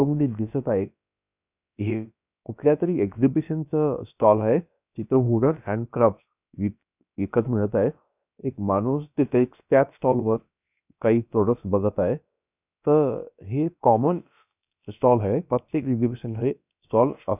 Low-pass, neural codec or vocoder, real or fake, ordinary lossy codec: 3.6 kHz; codec, 16 kHz, about 1 kbps, DyCAST, with the encoder's durations; fake; none